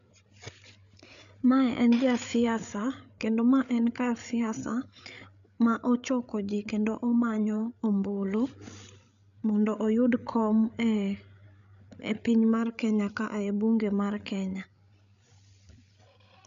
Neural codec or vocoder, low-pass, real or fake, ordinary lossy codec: codec, 16 kHz, 8 kbps, FreqCodec, larger model; 7.2 kHz; fake; none